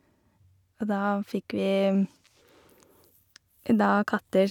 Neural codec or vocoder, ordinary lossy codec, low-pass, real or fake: none; none; 19.8 kHz; real